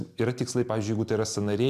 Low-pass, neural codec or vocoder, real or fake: 14.4 kHz; none; real